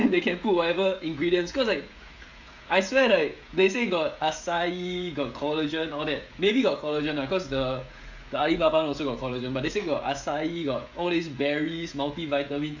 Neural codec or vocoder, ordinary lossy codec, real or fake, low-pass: codec, 16 kHz, 16 kbps, FreqCodec, smaller model; AAC, 48 kbps; fake; 7.2 kHz